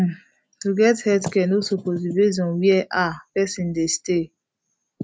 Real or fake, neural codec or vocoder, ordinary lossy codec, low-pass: real; none; none; none